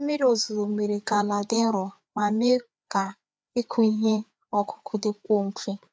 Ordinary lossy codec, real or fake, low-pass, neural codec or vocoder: none; fake; none; codec, 16 kHz, 4 kbps, FreqCodec, larger model